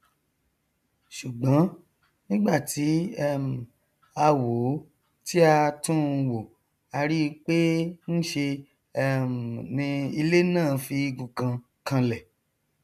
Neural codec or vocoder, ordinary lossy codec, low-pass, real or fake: none; none; 14.4 kHz; real